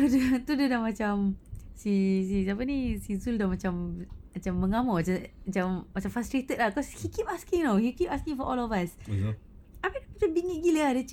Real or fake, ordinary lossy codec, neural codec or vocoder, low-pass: real; none; none; 19.8 kHz